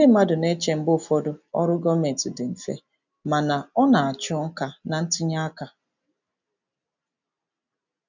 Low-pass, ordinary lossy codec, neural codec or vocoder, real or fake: 7.2 kHz; none; none; real